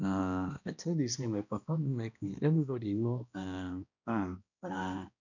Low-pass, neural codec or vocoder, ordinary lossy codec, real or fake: 7.2 kHz; codec, 16 kHz, 1 kbps, X-Codec, HuBERT features, trained on balanced general audio; none; fake